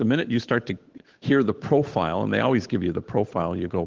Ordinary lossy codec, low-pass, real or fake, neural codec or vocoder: Opus, 16 kbps; 7.2 kHz; fake; codec, 16 kHz, 8 kbps, FunCodec, trained on Chinese and English, 25 frames a second